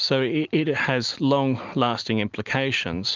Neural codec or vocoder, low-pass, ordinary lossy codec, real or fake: none; 7.2 kHz; Opus, 24 kbps; real